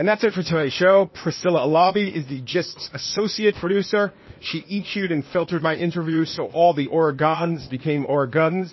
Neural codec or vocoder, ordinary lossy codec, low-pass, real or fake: codec, 16 kHz, 0.8 kbps, ZipCodec; MP3, 24 kbps; 7.2 kHz; fake